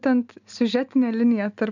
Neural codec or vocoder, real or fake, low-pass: none; real; 7.2 kHz